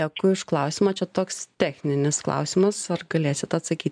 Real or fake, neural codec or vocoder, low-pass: real; none; 9.9 kHz